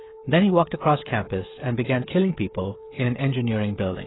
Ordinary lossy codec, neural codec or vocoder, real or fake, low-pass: AAC, 16 kbps; codec, 16 kHz, 16 kbps, FreqCodec, smaller model; fake; 7.2 kHz